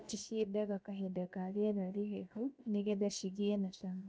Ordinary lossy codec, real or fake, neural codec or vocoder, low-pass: none; fake; codec, 16 kHz, 0.7 kbps, FocalCodec; none